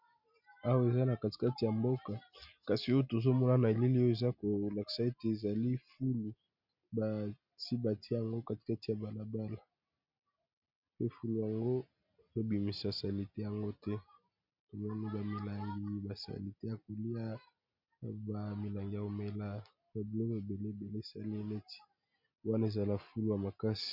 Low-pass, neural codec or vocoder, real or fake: 5.4 kHz; none; real